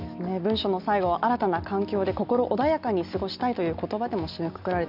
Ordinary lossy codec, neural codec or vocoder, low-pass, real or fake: none; none; 5.4 kHz; real